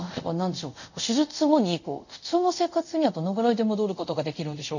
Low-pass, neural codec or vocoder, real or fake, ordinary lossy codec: 7.2 kHz; codec, 24 kHz, 0.5 kbps, DualCodec; fake; none